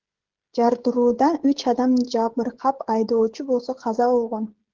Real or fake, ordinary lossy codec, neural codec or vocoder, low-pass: fake; Opus, 16 kbps; codec, 16 kHz, 16 kbps, FreqCodec, smaller model; 7.2 kHz